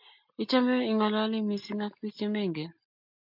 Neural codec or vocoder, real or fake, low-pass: none; real; 5.4 kHz